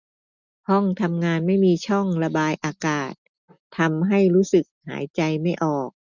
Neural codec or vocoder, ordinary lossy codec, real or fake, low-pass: none; none; real; 7.2 kHz